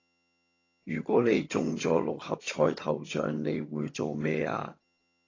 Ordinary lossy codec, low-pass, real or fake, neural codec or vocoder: AAC, 32 kbps; 7.2 kHz; fake; vocoder, 22.05 kHz, 80 mel bands, HiFi-GAN